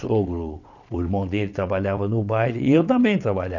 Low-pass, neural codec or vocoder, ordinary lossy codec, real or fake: 7.2 kHz; vocoder, 22.05 kHz, 80 mel bands, WaveNeXt; none; fake